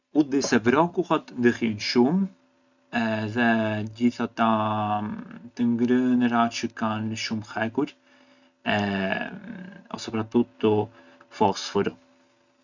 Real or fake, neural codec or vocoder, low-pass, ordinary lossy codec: real; none; 7.2 kHz; none